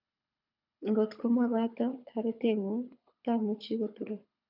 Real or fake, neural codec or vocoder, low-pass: fake; codec, 24 kHz, 6 kbps, HILCodec; 5.4 kHz